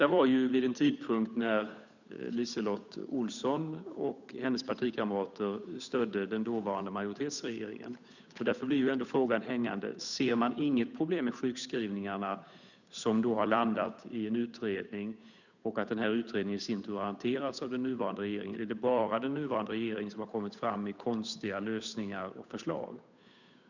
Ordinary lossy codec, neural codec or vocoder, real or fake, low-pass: none; codec, 16 kHz, 8 kbps, FunCodec, trained on Chinese and English, 25 frames a second; fake; 7.2 kHz